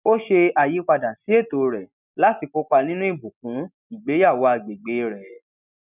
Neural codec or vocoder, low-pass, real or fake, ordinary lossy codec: none; 3.6 kHz; real; none